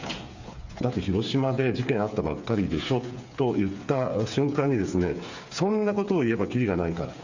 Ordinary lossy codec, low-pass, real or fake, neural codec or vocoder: Opus, 64 kbps; 7.2 kHz; fake; codec, 16 kHz, 8 kbps, FreqCodec, smaller model